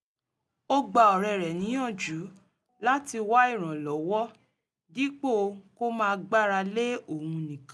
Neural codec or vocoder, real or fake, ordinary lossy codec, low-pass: none; real; none; none